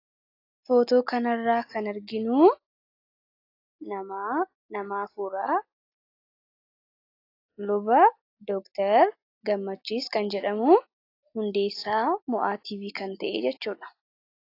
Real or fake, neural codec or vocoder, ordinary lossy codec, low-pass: real; none; AAC, 32 kbps; 5.4 kHz